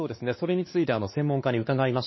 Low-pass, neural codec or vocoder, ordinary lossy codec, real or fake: 7.2 kHz; codec, 16 kHz, 2 kbps, X-Codec, WavLM features, trained on Multilingual LibriSpeech; MP3, 24 kbps; fake